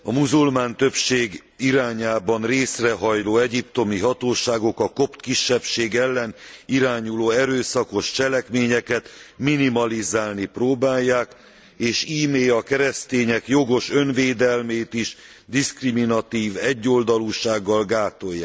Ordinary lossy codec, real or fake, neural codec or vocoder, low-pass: none; real; none; none